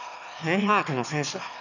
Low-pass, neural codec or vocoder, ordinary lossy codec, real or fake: 7.2 kHz; autoencoder, 22.05 kHz, a latent of 192 numbers a frame, VITS, trained on one speaker; none; fake